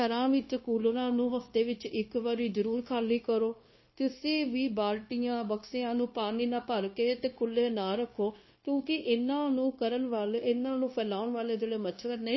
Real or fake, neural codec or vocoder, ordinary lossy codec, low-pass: fake; codec, 16 kHz, 0.9 kbps, LongCat-Audio-Codec; MP3, 24 kbps; 7.2 kHz